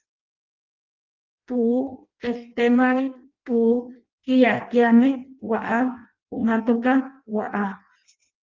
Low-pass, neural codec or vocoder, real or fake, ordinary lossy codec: 7.2 kHz; codec, 16 kHz in and 24 kHz out, 0.6 kbps, FireRedTTS-2 codec; fake; Opus, 24 kbps